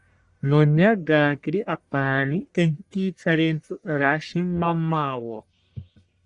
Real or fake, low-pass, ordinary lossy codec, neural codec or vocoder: fake; 10.8 kHz; Opus, 64 kbps; codec, 44.1 kHz, 1.7 kbps, Pupu-Codec